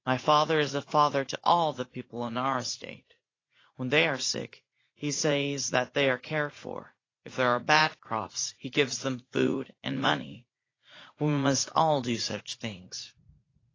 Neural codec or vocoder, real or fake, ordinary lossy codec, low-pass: vocoder, 44.1 kHz, 80 mel bands, Vocos; fake; AAC, 32 kbps; 7.2 kHz